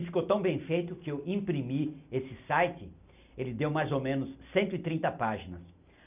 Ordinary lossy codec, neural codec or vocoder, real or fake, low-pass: none; none; real; 3.6 kHz